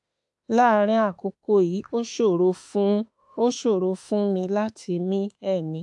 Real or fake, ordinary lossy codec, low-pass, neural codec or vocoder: fake; AAC, 64 kbps; 10.8 kHz; autoencoder, 48 kHz, 32 numbers a frame, DAC-VAE, trained on Japanese speech